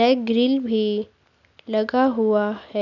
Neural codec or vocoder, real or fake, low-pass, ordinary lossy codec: none; real; 7.2 kHz; none